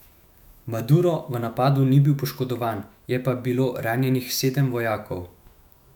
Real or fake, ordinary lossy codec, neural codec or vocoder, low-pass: fake; none; autoencoder, 48 kHz, 128 numbers a frame, DAC-VAE, trained on Japanese speech; 19.8 kHz